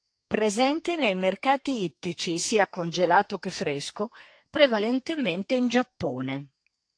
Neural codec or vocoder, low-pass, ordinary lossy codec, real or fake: codec, 32 kHz, 1.9 kbps, SNAC; 9.9 kHz; AAC, 48 kbps; fake